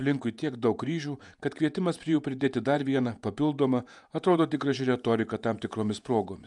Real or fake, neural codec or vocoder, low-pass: fake; vocoder, 48 kHz, 128 mel bands, Vocos; 10.8 kHz